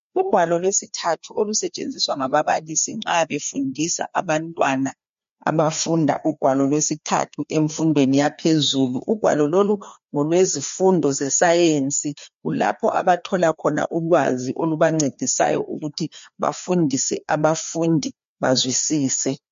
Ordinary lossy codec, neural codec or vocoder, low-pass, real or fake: MP3, 48 kbps; codec, 16 kHz, 2 kbps, FreqCodec, larger model; 7.2 kHz; fake